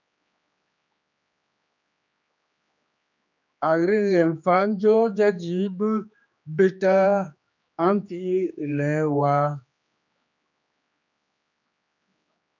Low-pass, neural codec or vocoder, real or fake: 7.2 kHz; codec, 16 kHz, 2 kbps, X-Codec, HuBERT features, trained on general audio; fake